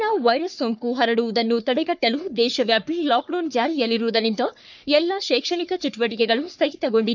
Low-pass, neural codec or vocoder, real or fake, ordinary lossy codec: 7.2 kHz; codec, 44.1 kHz, 3.4 kbps, Pupu-Codec; fake; none